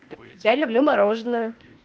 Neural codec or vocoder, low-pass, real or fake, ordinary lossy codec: codec, 16 kHz, 0.8 kbps, ZipCodec; none; fake; none